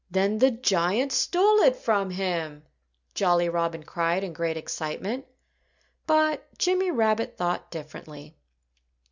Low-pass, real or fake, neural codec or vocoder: 7.2 kHz; real; none